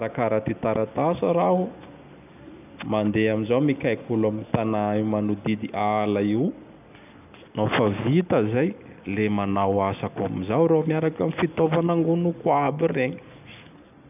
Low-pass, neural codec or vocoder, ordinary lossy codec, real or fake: 3.6 kHz; none; none; real